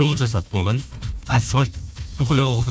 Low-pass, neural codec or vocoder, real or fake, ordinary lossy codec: none; codec, 16 kHz, 1 kbps, FunCodec, trained on Chinese and English, 50 frames a second; fake; none